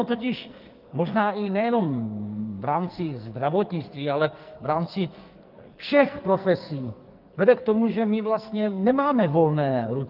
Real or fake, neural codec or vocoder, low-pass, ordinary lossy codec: fake; codec, 44.1 kHz, 2.6 kbps, SNAC; 5.4 kHz; Opus, 32 kbps